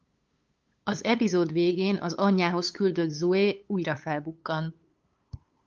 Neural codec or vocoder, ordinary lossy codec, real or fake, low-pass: codec, 16 kHz, 8 kbps, FunCodec, trained on LibriTTS, 25 frames a second; Opus, 24 kbps; fake; 7.2 kHz